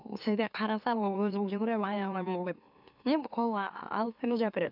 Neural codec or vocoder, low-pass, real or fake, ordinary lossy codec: autoencoder, 44.1 kHz, a latent of 192 numbers a frame, MeloTTS; 5.4 kHz; fake; none